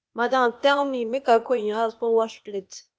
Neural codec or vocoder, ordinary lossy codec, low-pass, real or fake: codec, 16 kHz, 0.8 kbps, ZipCodec; none; none; fake